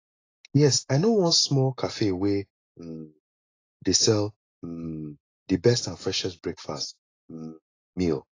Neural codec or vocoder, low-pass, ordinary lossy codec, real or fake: none; 7.2 kHz; AAC, 32 kbps; real